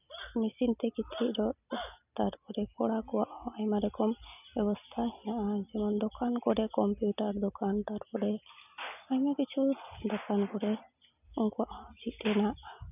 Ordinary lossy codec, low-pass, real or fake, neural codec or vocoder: none; 3.6 kHz; real; none